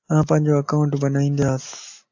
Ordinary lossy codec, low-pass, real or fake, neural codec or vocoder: AAC, 48 kbps; 7.2 kHz; real; none